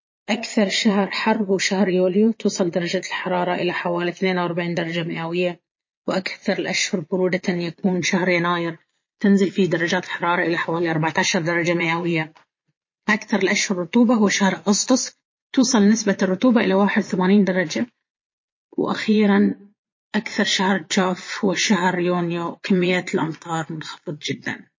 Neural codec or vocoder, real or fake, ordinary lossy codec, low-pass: vocoder, 22.05 kHz, 80 mel bands, Vocos; fake; MP3, 32 kbps; 7.2 kHz